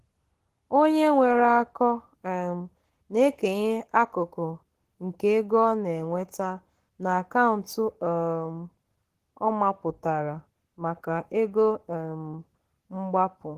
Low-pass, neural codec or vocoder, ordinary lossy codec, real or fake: 14.4 kHz; codec, 44.1 kHz, 7.8 kbps, Pupu-Codec; Opus, 16 kbps; fake